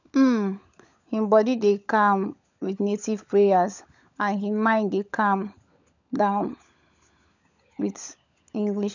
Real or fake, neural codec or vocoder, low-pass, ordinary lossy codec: fake; codec, 16 kHz, 16 kbps, FunCodec, trained on LibriTTS, 50 frames a second; 7.2 kHz; none